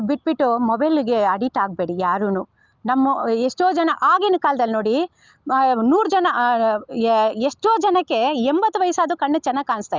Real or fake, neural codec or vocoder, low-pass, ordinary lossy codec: real; none; 7.2 kHz; Opus, 32 kbps